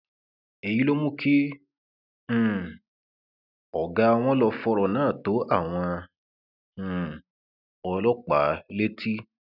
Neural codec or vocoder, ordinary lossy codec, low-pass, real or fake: none; none; 5.4 kHz; real